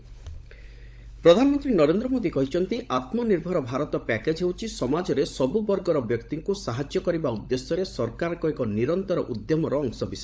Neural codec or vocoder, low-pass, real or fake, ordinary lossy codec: codec, 16 kHz, 16 kbps, FunCodec, trained on LibriTTS, 50 frames a second; none; fake; none